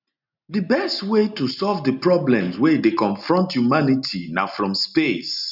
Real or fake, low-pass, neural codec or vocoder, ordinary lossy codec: real; 5.4 kHz; none; none